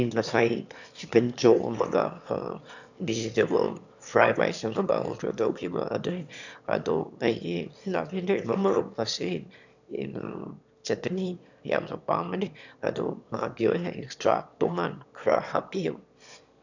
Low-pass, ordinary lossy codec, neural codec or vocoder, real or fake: 7.2 kHz; none; autoencoder, 22.05 kHz, a latent of 192 numbers a frame, VITS, trained on one speaker; fake